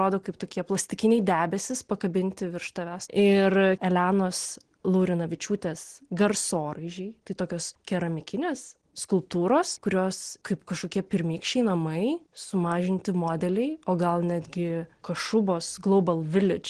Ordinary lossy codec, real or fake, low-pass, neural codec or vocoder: Opus, 16 kbps; real; 9.9 kHz; none